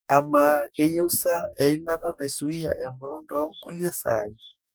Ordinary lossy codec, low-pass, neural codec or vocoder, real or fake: none; none; codec, 44.1 kHz, 2.6 kbps, DAC; fake